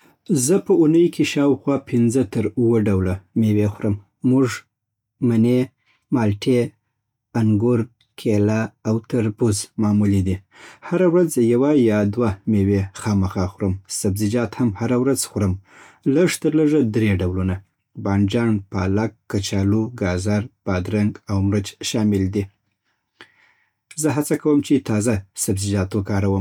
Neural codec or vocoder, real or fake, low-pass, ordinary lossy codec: none; real; 19.8 kHz; none